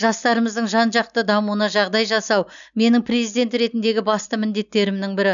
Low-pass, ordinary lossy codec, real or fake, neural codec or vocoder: 7.2 kHz; none; real; none